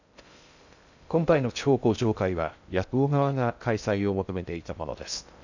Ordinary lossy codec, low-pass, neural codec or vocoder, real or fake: none; 7.2 kHz; codec, 16 kHz in and 24 kHz out, 0.6 kbps, FocalCodec, streaming, 2048 codes; fake